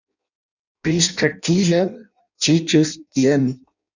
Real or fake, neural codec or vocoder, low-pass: fake; codec, 16 kHz in and 24 kHz out, 0.6 kbps, FireRedTTS-2 codec; 7.2 kHz